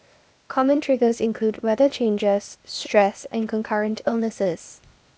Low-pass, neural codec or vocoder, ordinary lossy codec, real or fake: none; codec, 16 kHz, 0.8 kbps, ZipCodec; none; fake